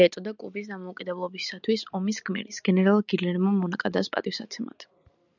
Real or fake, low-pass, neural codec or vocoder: real; 7.2 kHz; none